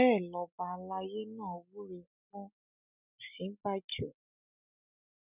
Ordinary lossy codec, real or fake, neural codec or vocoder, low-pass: none; real; none; 3.6 kHz